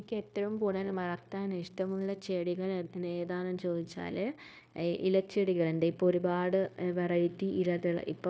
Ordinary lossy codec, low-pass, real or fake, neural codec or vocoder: none; none; fake; codec, 16 kHz, 0.9 kbps, LongCat-Audio-Codec